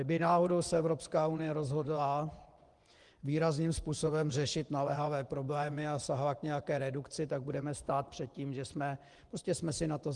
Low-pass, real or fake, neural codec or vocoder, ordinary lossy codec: 10.8 kHz; fake; vocoder, 24 kHz, 100 mel bands, Vocos; Opus, 24 kbps